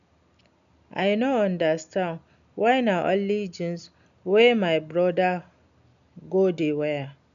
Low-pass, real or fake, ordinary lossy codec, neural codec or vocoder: 7.2 kHz; real; none; none